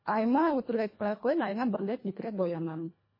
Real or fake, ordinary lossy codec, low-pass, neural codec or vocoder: fake; MP3, 24 kbps; 5.4 kHz; codec, 24 kHz, 1.5 kbps, HILCodec